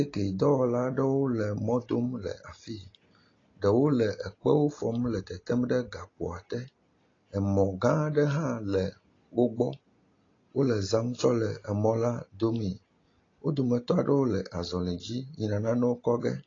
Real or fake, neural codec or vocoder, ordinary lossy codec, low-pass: real; none; AAC, 32 kbps; 7.2 kHz